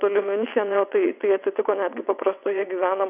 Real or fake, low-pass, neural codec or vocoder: fake; 3.6 kHz; vocoder, 22.05 kHz, 80 mel bands, WaveNeXt